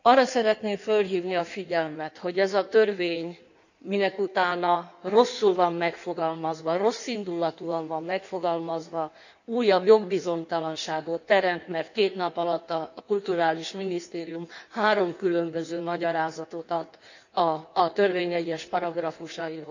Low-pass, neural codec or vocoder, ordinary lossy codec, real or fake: 7.2 kHz; codec, 16 kHz in and 24 kHz out, 1.1 kbps, FireRedTTS-2 codec; none; fake